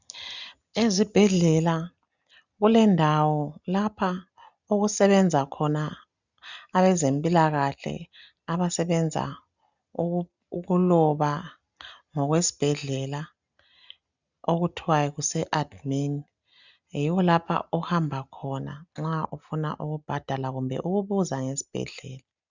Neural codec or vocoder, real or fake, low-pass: none; real; 7.2 kHz